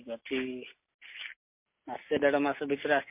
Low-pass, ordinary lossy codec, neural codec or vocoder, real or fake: 3.6 kHz; MP3, 24 kbps; none; real